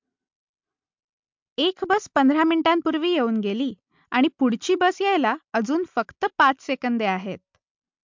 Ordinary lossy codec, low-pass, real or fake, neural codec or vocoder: MP3, 64 kbps; 7.2 kHz; real; none